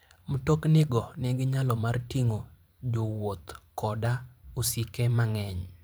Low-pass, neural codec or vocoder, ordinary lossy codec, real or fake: none; vocoder, 44.1 kHz, 128 mel bands every 512 samples, BigVGAN v2; none; fake